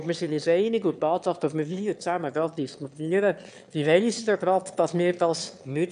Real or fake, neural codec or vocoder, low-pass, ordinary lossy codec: fake; autoencoder, 22.05 kHz, a latent of 192 numbers a frame, VITS, trained on one speaker; 9.9 kHz; AAC, 96 kbps